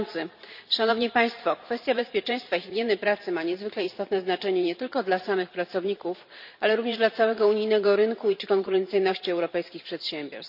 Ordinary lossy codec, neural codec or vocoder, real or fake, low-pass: none; vocoder, 44.1 kHz, 128 mel bands every 512 samples, BigVGAN v2; fake; 5.4 kHz